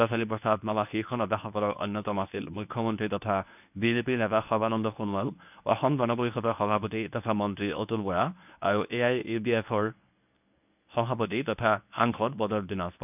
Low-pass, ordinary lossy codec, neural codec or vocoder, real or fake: 3.6 kHz; none; codec, 24 kHz, 0.9 kbps, WavTokenizer, medium speech release version 1; fake